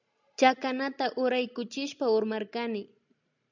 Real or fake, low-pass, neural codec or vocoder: real; 7.2 kHz; none